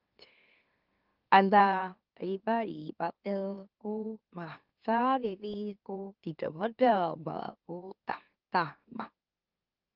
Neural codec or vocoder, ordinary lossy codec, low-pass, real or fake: autoencoder, 44.1 kHz, a latent of 192 numbers a frame, MeloTTS; Opus, 24 kbps; 5.4 kHz; fake